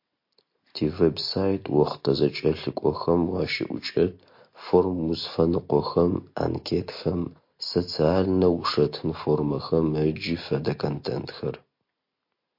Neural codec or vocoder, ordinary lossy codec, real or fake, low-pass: none; MP3, 32 kbps; real; 5.4 kHz